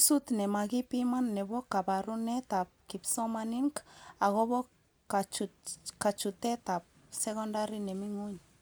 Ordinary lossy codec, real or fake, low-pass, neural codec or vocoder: none; real; none; none